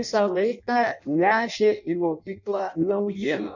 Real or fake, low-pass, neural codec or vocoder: fake; 7.2 kHz; codec, 16 kHz in and 24 kHz out, 0.6 kbps, FireRedTTS-2 codec